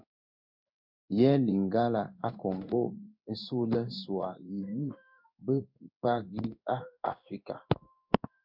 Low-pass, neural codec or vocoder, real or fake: 5.4 kHz; codec, 16 kHz in and 24 kHz out, 1 kbps, XY-Tokenizer; fake